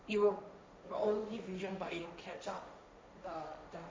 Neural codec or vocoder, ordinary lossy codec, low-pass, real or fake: codec, 16 kHz, 1.1 kbps, Voila-Tokenizer; none; none; fake